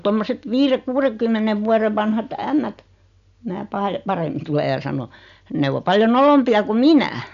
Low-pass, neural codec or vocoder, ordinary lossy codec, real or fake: 7.2 kHz; none; none; real